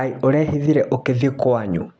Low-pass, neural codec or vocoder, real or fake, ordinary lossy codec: none; none; real; none